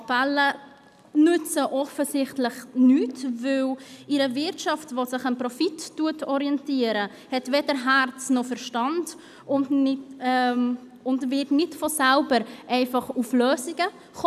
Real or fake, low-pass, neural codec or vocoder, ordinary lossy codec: real; 14.4 kHz; none; none